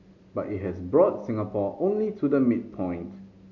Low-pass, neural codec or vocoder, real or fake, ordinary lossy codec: 7.2 kHz; none; real; MP3, 48 kbps